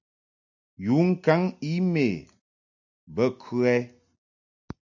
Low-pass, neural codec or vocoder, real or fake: 7.2 kHz; none; real